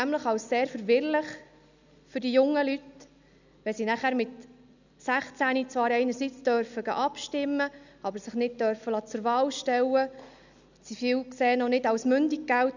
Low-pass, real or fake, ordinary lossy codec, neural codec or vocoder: 7.2 kHz; real; none; none